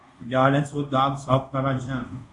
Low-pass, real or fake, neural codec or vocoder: 10.8 kHz; fake; codec, 24 kHz, 0.5 kbps, DualCodec